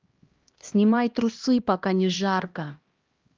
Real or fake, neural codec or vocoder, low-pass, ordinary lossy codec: fake; codec, 16 kHz, 1 kbps, X-Codec, HuBERT features, trained on LibriSpeech; 7.2 kHz; Opus, 32 kbps